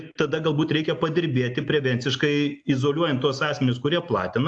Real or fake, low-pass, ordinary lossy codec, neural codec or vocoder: real; 9.9 kHz; AAC, 64 kbps; none